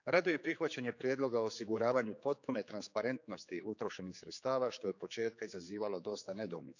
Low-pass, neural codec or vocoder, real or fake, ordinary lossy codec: 7.2 kHz; codec, 16 kHz, 4 kbps, X-Codec, HuBERT features, trained on general audio; fake; none